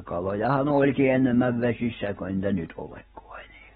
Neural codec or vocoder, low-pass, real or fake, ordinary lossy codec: none; 10.8 kHz; real; AAC, 16 kbps